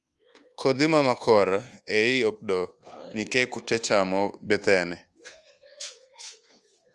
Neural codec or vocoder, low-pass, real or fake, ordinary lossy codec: codec, 24 kHz, 1.2 kbps, DualCodec; 10.8 kHz; fake; Opus, 32 kbps